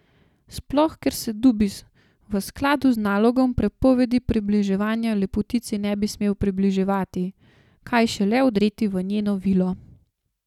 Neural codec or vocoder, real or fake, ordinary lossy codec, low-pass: none; real; none; 19.8 kHz